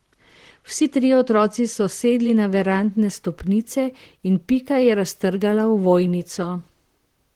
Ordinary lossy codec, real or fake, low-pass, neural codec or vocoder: Opus, 16 kbps; fake; 19.8 kHz; vocoder, 44.1 kHz, 128 mel bands, Pupu-Vocoder